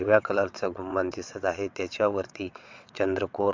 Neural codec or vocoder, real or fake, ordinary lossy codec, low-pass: vocoder, 22.05 kHz, 80 mel bands, WaveNeXt; fake; MP3, 64 kbps; 7.2 kHz